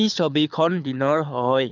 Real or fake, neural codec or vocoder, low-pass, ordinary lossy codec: fake; codec, 24 kHz, 6 kbps, HILCodec; 7.2 kHz; none